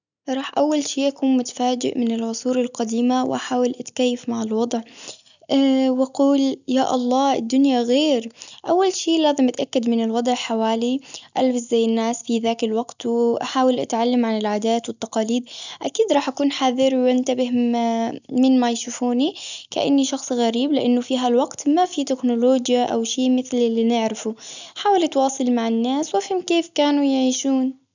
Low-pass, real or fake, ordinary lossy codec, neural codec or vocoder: 7.2 kHz; real; none; none